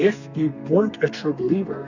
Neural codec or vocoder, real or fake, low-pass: codec, 32 kHz, 1.9 kbps, SNAC; fake; 7.2 kHz